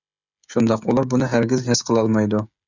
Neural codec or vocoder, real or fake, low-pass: codec, 16 kHz, 16 kbps, FreqCodec, smaller model; fake; 7.2 kHz